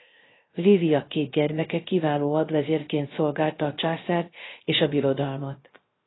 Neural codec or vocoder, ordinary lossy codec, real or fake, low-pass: codec, 16 kHz, 0.3 kbps, FocalCodec; AAC, 16 kbps; fake; 7.2 kHz